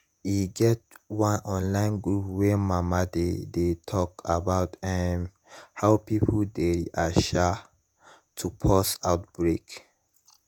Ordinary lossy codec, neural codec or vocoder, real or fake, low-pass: none; vocoder, 48 kHz, 128 mel bands, Vocos; fake; none